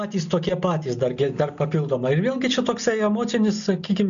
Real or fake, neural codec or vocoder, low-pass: real; none; 7.2 kHz